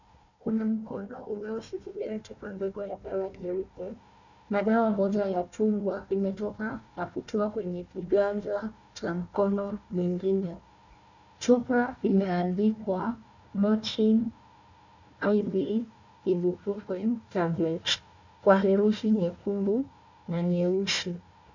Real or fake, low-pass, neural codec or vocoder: fake; 7.2 kHz; codec, 16 kHz, 1 kbps, FunCodec, trained on Chinese and English, 50 frames a second